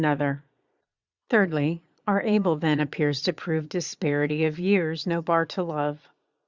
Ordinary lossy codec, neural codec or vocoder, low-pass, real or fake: Opus, 64 kbps; vocoder, 22.05 kHz, 80 mel bands, Vocos; 7.2 kHz; fake